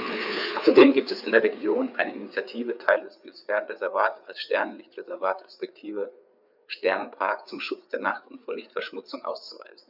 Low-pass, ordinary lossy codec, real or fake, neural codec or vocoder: 5.4 kHz; none; fake; codec, 16 kHz, 4 kbps, FreqCodec, larger model